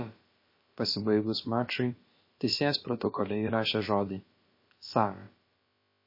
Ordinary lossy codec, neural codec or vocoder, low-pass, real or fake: MP3, 24 kbps; codec, 16 kHz, about 1 kbps, DyCAST, with the encoder's durations; 5.4 kHz; fake